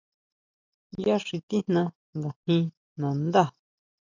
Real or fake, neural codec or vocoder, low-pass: real; none; 7.2 kHz